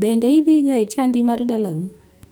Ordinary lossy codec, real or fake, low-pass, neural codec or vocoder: none; fake; none; codec, 44.1 kHz, 2.6 kbps, SNAC